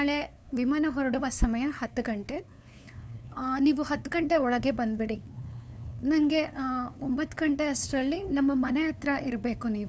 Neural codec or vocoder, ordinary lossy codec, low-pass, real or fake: codec, 16 kHz, 2 kbps, FunCodec, trained on LibriTTS, 25 frames a second; none; none; fake